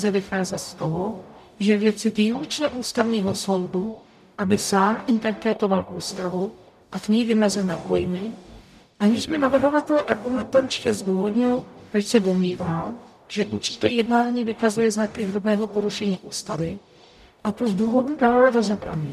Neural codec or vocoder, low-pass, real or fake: codec, 44.1 kHz, 0.9 kbps, DAC; 14.4 kHz; fake